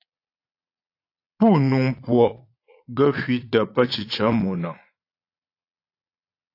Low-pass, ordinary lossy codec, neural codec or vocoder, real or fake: 5.4 kHz; AAC, 32 kbps; vocoder, 22.05 kHz, 80 mel bands, Vocos; fake